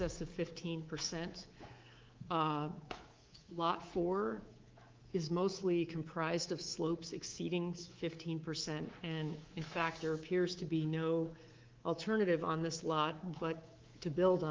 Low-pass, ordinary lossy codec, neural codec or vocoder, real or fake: 7.2 kHz; Opus, 32 kbps; codec, 24 kHz, 3.1 kbps, DualCodec; fake